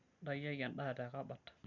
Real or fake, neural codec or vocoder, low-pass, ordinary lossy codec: real; none; 7.2 kHz; none